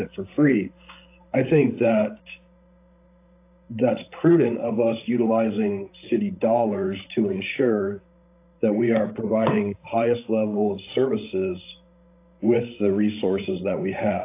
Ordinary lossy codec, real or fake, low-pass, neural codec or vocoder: AAC, 24 kbps; fake; 3.6 kHz; codec, 16 kHz, 16 kbps, FreqCodec, larger model